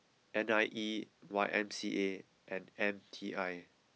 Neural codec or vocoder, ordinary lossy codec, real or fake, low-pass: none; none; real; none